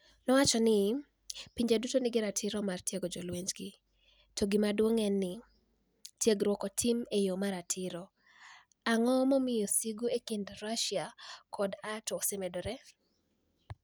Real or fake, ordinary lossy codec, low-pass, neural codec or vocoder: real; none; none; none